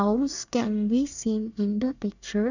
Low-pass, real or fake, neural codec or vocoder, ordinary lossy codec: 7.2 kHz; fake; codec, 24 kHz, 1 kbps, SNAC; none